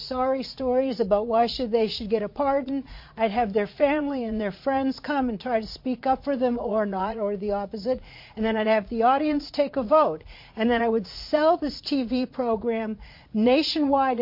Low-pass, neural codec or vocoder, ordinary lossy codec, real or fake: 5.4 kHz; vocoder, 44.1 kHz, 80 mel bands, Vocos; MP3, 32 kbps; fake